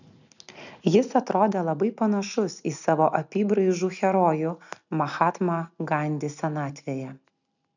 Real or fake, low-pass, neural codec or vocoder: real; 7.2 kHz; none